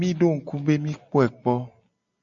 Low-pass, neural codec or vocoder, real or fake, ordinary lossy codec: 7.2 kHz; none; real; AAC, 64 kbps